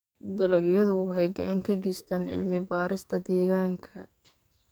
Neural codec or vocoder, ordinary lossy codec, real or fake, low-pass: codec, 44.1 kHz, 2.6 kbps, SNAC; none; fake; none